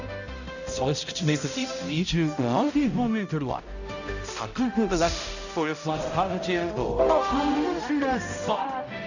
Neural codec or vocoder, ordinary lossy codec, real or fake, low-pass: codec, 16 kHz, 0.5 kbps, X-Codec, HuBERT features, trained on balanced general audio; none; fake; 7.2 kHz